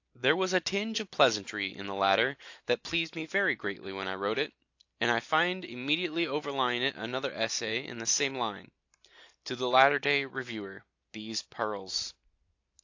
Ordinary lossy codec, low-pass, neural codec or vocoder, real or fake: AAC, 48 kbps; 7.2 kHz; none; real